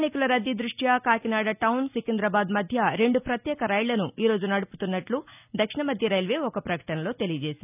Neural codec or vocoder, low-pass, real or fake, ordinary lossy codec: none; 3.6 kHz; real; none